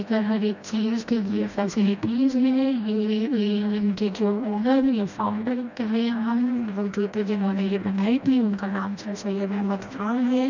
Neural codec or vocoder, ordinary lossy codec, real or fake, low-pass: codec, 16 kHz, 1 kbps, FreqCodec, smaller model; none; fake; 7.2 kHz